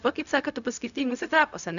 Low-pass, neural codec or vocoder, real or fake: 7.2 kHz; codec, 16 kHz, 0.4 kbps, LongCat-Audio-Codec; fake